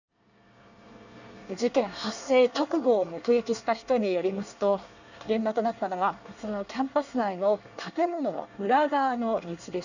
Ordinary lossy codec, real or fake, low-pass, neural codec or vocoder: none; fake; 7.2 kHz; codec, 24 kHz, 1 kbps, SNAC